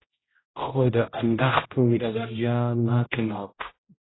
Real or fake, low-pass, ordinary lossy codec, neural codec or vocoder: fake; 7.2 kHz; AAC, 16 kbps; codec, 16 kHz, 0.5 kbps, X-Codec, HuBERT features, trained on general audio